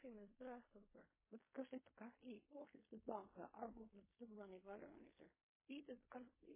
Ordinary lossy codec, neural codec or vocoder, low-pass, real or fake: MP3, 16 kbps; codec, 16 kHz in and 24 kHz out, 0.4 kbps, LongCat-Audio-Codec, fine tuned four codebook decoder; 3.6 kHz; fake